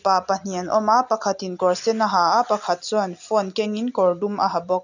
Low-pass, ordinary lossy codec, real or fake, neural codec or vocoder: 7.2 kHz; none; real; none